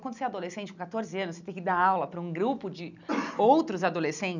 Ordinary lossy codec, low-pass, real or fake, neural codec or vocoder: none; 7.2 kHz; real; none